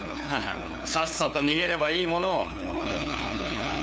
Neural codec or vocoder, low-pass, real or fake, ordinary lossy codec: codec, 16 kHz, 2 kbps, FunCodec, trained on LibriTTS, 25 frames a second; none; fake; none